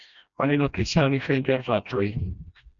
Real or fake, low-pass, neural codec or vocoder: fake; 7.2 kHz; codec, 16 kHz, 1 kbps, FreqCodec, smaller model